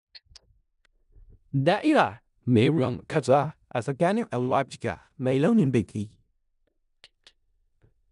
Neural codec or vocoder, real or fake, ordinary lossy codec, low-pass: codec, 16 kHz in and 24 kHz out, 0.4 kbps, LongCat-Audio-Codec, four codebook decoder; fake; none; 10.8 kHz